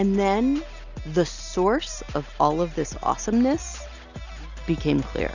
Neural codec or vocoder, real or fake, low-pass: none; real; 7.2 kHz